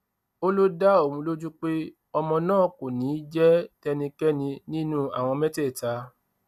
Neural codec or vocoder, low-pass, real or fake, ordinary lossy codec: none; 14.4 kHz; real; none